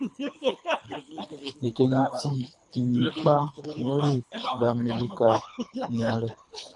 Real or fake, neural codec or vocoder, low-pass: fake; codec, 24 kHz, 3 kbps, HILCodec; 10.8 kHz